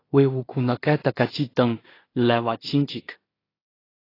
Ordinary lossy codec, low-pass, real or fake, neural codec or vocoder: AAC, 24 kbps; 5.4 kHz; fake; codec, 16 kHz in and 24 kHz out, 0.4 kbps, LongCat-Audio-Codec, two codebook decoder